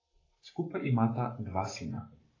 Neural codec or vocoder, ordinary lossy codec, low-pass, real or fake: codec, 44.1 kHz, 7.8 kbps, Pupu-Codec; AAC, 32 kbps; 7.2 kHz; fake